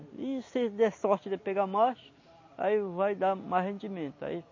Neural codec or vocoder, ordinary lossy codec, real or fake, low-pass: none; MP3, 32 kbps; real; 7.2 kHz